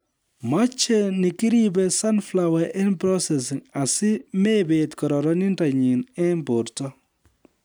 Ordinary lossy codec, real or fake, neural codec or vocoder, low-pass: none; real; none; none